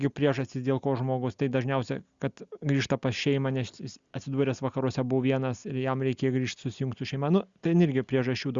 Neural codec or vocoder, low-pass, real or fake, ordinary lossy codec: none; 7.2 kHz; real; Opus, 64 kbps